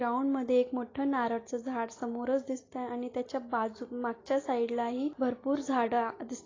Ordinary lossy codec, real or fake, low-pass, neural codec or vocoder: AAC, 32 kbps; real; 7.2 kHz; none